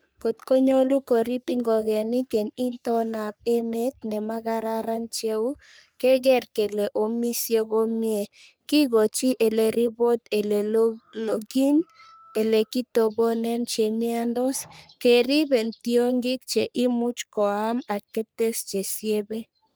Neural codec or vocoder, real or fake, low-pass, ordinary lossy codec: codec, 44.1 kHz, 3.4 kbps, Pupu-Codec; fake; none; none